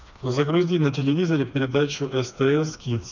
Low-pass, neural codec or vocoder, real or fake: 7.2 kHz; codec, 16 kHz, 2 kbps, FreqCodec, smaller model; fake